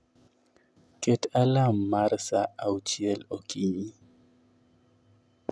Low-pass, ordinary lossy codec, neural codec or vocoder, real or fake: none; none; none; real